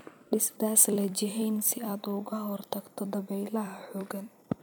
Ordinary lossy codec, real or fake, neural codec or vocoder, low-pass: none; fake; vocoder, 44.1 kHz, 128 mel bands every 256 samples, BigVGAN v2; none